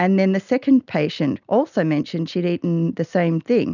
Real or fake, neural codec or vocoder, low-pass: real; none; 7.2 kHz